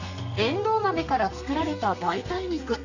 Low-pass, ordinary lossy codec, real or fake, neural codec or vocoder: 7.2 kHz; none; fake; codec, 32 kHz, 1.9 kbps, SNAC